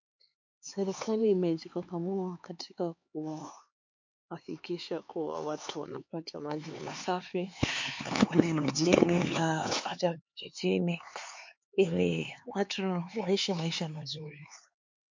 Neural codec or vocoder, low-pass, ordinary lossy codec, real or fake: codec, 16 kHz, 4 kbps, X-Codec, HuBERT features, trained on LibriSpeech; 7.2 kHz; MP3, 48 kbps; fake